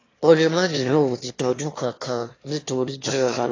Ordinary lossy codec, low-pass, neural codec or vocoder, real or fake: AAC, 32 kbps; 7.2 kHz; autoencoder, 22.05 kHz, a latent of 192 numbers a frame, VITS, trained on one speaker; fake